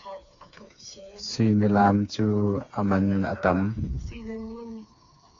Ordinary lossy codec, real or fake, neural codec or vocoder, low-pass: AAC, 48 kbps; fake; codec, 16 kHz, 4 kbps, FreqCodec, smaller model; 7.2 kHz